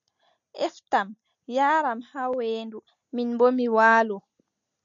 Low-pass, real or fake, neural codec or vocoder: 7.2 kHz; real; none